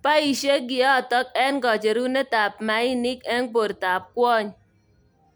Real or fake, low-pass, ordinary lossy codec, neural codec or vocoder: real; none; none; none